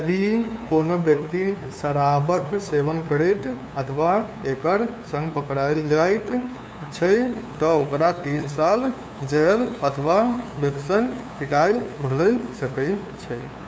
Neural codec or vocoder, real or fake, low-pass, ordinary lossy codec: codec, 16 kHz, 2 kbps, FunCodec, trained on LibriTTS, 25 frames a second; fake; none; none